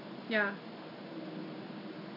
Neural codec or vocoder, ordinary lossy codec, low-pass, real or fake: none; AAC, 32 kbps; 5.4 kHz; real